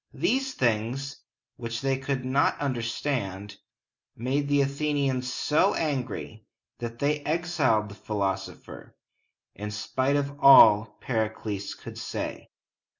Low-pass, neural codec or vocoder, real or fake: 7.2 kHz; none; real